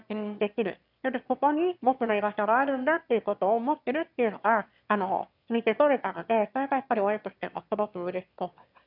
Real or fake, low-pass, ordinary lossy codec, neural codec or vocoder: fake; 5.4 kHz; none; autoencoder, 22.05 kHz, a latent of 192 numbers a frame, VITS, trained on one speaker